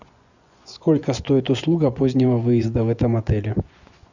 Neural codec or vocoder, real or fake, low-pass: vocoder, 24 kHz, 100 mel bands, Vocos; fake; 7.2 kHz